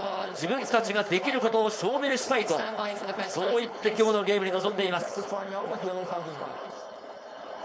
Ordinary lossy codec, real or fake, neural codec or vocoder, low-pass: none; fake; codec, 16 kHz, 4.8 kbps, FACodec; none